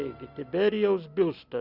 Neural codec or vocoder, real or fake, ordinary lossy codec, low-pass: none; real; Opus, 64 kbps; 5.4 kHz